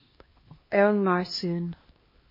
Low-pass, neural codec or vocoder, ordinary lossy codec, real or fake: 5.4 kHz; codec, 16 kHz, 1 kbps, X-Codec, HuBERT features, trained on LibriSpeech; MP3, 24 kbps; fake